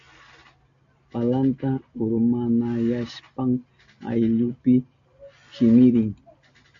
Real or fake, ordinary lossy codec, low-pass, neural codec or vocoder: real; AAC, 64 kbps; 7.2 kHz; none